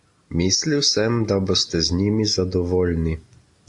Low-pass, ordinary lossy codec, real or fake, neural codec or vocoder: 10.8 kHz; AAC, 64 kbps; real; none